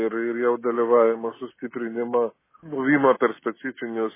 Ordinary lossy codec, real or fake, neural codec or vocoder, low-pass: MP3, 16 kbps; real; none; 3.6 kHz